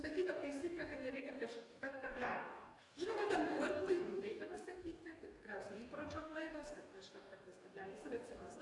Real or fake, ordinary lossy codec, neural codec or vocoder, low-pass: fake; AAC, 48 kbps; codec, 44.1 kHz, 2.6 kbps, DAC; 10.8 kHz